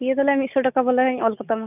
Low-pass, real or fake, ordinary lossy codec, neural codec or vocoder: 3.6 kHz; real; none; none